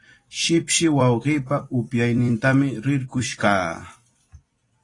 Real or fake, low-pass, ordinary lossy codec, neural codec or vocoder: fake; 10.8 kHz; AAC, 32 kbps; vocoder, 44.1 kHz, 128 mel bands every 256 samples, BigVGAN v2